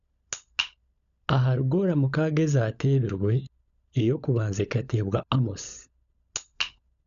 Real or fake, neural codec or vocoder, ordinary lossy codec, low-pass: fake; codec, 16 kHz, 16 kbps, FunCodec, trained on LibriTTS, 50 frames a second; none; 7.2 kHz